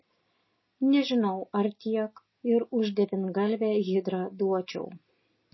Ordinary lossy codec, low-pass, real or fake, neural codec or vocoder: MP3, 24 kbps; 7.2 kHz; real; none